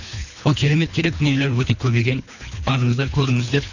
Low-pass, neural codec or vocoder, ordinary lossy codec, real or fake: 7.2 kHz; codec, 24 kHz, 3 kbps, HILCodec; none; fake